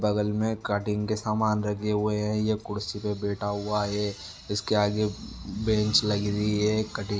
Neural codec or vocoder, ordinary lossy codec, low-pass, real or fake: none; none; none; real